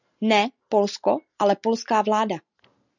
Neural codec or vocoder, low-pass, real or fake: none; 7.2 kHz; real